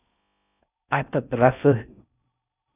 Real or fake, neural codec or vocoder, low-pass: fake; codec, 16 kHz in and 24 kHz out, 0.6 kbps, FocalCodec, streaming, 4096 codes; 3.6 kHz